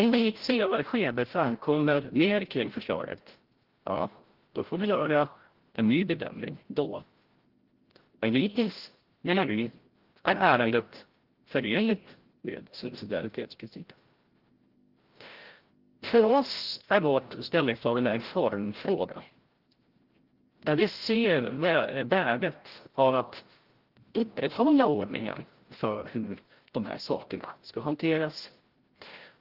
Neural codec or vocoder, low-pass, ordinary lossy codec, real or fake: codec, 16 kHz, 0.5 kbps, FreqCodec, larger model; 5.4 kHz; Opus, 16 kbps; fake